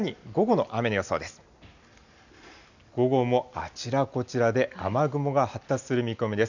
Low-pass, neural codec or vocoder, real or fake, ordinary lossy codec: 7.2 kHz; none; real; none